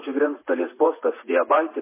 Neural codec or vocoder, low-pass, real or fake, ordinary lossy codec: vocoder, 44.1 kHz, 80 mel bands, Vocos; 3.6 kHz; fake; MP3, 16 kbps